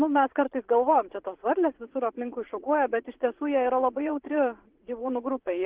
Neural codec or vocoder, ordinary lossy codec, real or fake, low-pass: vocoder, 44.1 kHz, 80 mel bands, Vocos; Opus, 16 kbps; fake; 3.6 kHz